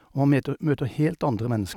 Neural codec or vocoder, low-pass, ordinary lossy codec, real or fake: none; 19.8 kHz; none; real